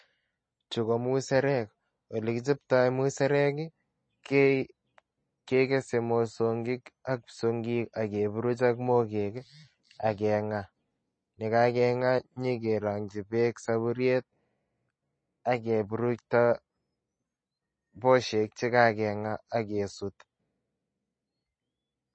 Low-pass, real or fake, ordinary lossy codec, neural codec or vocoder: 9.9 kHz; real; MP3, 32 kbps; none